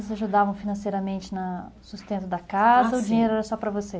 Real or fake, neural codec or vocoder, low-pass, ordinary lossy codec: real; none; none; none